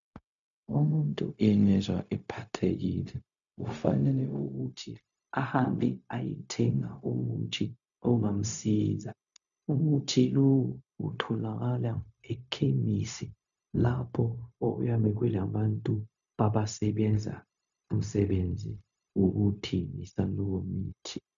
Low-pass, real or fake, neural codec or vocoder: 7.2 kHz; fake; codec, 16 kHz, 0.4 kbps, LongCat-Audio-Codec